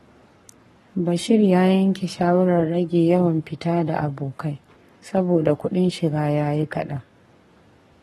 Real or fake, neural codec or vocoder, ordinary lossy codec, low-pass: fake; codec, 44.1 kHz, 7.8 kbps, Pupu-Codec; AAC, 32 kbps; 19.8 kHz